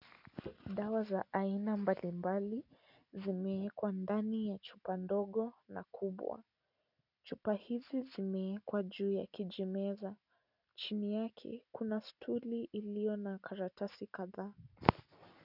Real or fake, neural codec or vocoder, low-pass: real; none; 5.4 kHz